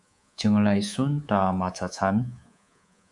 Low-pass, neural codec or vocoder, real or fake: 10.8 kHz; codec, 24 kHz, 3.1 kbps, DualCodec; fake